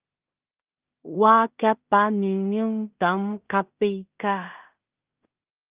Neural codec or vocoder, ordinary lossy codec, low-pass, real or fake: codec, 16 kHz in and 24 kHz out, 0.4 kbps, LongCat-Audio-Codec, two codebook decoder; Opus, 32 kbps; 3.6 kHz; fake